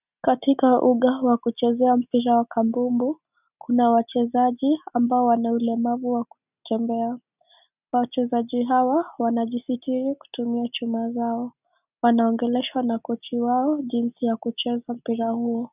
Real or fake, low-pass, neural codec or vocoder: real; 3.6 kHz; none